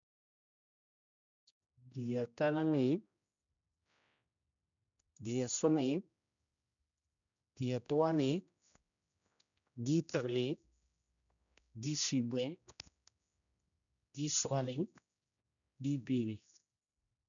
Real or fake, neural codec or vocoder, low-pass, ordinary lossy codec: fake; codec, 16 kHz, 1 kbps, X-Codec, HuBERT features, trained on general audio; 7.2 kHz; none